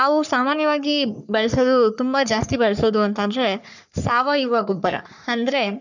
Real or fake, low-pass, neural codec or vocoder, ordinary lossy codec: fake; 7.2 kHz; codec, 44.1 kHz, 3.4 kbps, Pupu-Codec; none